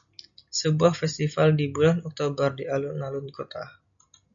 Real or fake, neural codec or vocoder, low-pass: real; none; 7.2 kHz